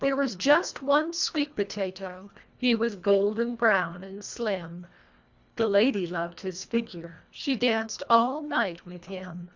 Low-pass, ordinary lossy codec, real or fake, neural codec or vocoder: 7.2 kHz; Opus, 64 kbps; fake; codec, 24 kHz, 1.5 kbps, HILCodec